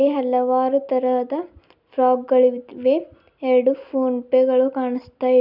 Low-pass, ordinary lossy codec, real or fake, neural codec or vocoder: 5.4 kHz; none; real; none